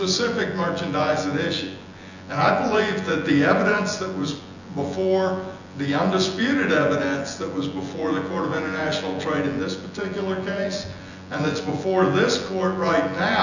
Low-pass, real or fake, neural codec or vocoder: 7.2 kHz; fake; vocoder, 24 kHz, 100 mel bands, Vocos